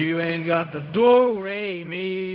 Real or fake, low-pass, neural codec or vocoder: fake; 5.4 kHz; codec, 16 kHz in and 24 kHz out, 0.4 kbps, LongCat-Audio-Codec, fine tuned four codebook decoder